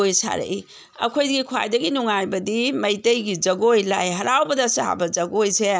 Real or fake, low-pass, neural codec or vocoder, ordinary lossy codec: real; none; none; none